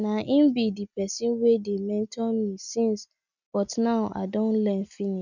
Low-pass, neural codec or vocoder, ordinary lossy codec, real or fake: 7.2 kHz; none; none; real